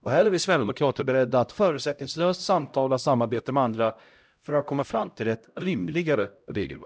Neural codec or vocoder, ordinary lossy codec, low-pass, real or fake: codec, 16 kHz, 0.5 kbps, X-Codec, HuBERT features, trained on LibriSpeech; none; none; fake